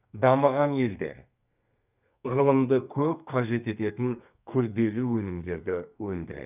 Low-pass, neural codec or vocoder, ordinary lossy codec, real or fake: 3.6 kHz; codec, 32 kHz, 1.9 kbps, SNAC; none; fake